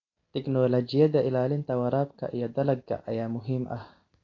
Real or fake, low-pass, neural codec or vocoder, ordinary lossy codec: real; 7.2 kHz; none; AAC, 32 kbps